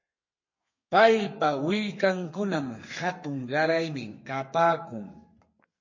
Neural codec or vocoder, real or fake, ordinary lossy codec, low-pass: codec, 32 kHz, 1.9 kbps, SNAC; fake; MP3, 32 kbps; 7.2 kHz